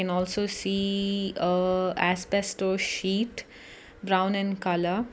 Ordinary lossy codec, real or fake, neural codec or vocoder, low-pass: none; real; none; none